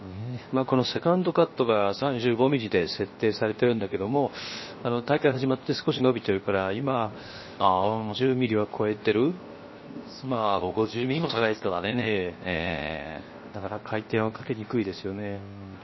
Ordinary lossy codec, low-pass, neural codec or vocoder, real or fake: MP3, 24 kbps; 7.2 kHz; codec, 16 kHz, 0.7 kbps, FocalCodec; fake